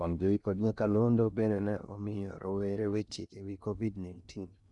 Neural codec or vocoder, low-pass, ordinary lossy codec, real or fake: codec, 16 kHz in and 24 kHz out, 0.6 kbps, FocalCodec, streaming, 2048 codes; 10.8 kHz; none; fake